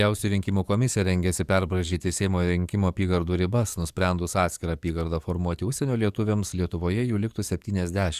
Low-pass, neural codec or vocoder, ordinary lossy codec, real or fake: 14.4 kHz; codec, 44.1 kHz, 7.8 kbps, DAC; Opus, 64 kbps; fake